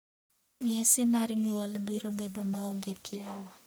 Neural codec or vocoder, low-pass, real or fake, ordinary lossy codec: codec, 44.1 kHz, 1.7 kbps, Pupu-Codec; none; fake; none